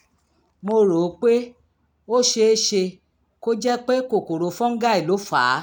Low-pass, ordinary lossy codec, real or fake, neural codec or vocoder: none; none; real; none